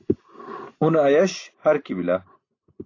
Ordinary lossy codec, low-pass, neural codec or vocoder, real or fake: AAC, 32 kbps; 7.2 kHz; none; real